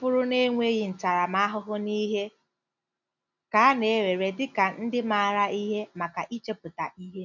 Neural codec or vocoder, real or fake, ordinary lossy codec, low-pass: none; real; none; 7.2 kHz